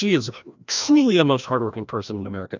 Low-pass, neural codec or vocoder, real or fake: 7.2 kHz; codec, 16 kHz, 1 kbps, FreqCodec, larger model; fake